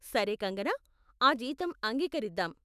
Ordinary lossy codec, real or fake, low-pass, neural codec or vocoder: none; fake; 14.4 kHz; autoencoder, 48 kHz, 128 numbers a frame, DAC-VAE, trained on Japanese speech